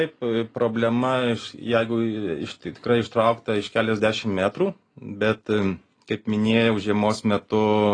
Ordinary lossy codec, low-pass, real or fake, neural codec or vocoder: AAC, 32 kbps; 9.9 kHz; real; none